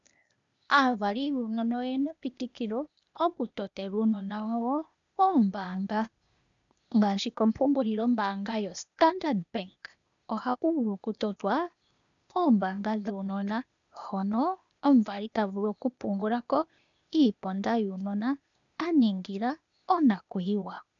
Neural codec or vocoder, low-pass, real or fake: codec, 16 kHz, 0.8 kbps, ZipCodec; 7.2 kHz; fake